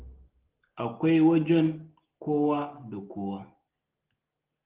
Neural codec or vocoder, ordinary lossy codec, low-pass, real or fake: none; Opus, 16 kbps; 3.6 kHz; real